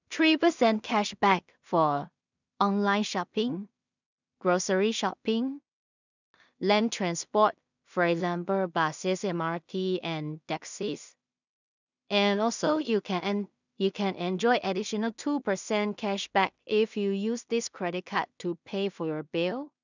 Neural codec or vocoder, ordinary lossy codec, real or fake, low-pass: codec, 16 kHz in and 24 kHz out, 0.4 kbps, LongCat-Audio-Codec, two codebook decoder; none; fake; 7.2 kHz